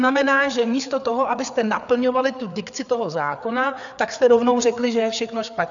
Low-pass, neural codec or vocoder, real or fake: 7.2 kHz; codec, 16 kHz, 4 kbps, FreqCodec, larger model; fake